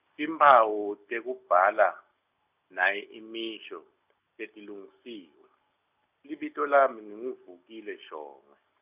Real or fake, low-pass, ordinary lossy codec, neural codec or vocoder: real; 3.6 kHz; none; none